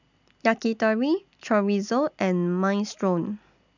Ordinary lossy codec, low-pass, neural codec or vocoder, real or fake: none; 7.2 kHz; none; real